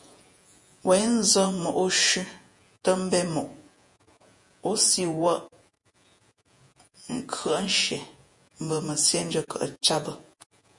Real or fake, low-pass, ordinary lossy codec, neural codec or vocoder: fake; 10.8 kHz; MP3, 48 kbps; vocoder, 48 kHz, 128 mel bands, Vocos